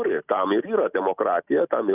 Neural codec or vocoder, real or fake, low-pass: none; real; 3.6 kHz